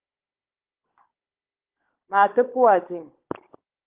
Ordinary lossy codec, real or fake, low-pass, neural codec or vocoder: Opus, 16 kbps; fake; 3.6 kHz; codec, 16 kHz, 16 kbps, FunCodec, trained on Chinese and English, 50 frames a second